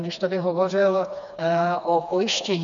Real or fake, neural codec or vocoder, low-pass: fake; codec, 16 kHz, 2 kbps, FreqCodec, smaller model; 7.2 kHz